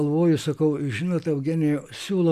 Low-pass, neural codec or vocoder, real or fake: 14.4 kHz; none; real